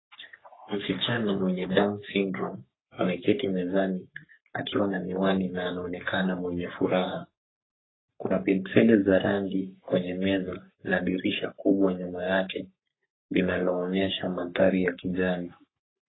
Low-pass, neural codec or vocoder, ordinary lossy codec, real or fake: 7.2 kHz; codec, 44.1 kHz, 3.4 kbps, Pupu-Codec; AAC, 16 kbps; fake